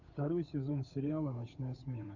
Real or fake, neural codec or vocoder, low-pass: fake; codec, 16 kHz, 8 kbps, FunCodec, trained on Chinese and English, 25 frames a second; 7.2 kHz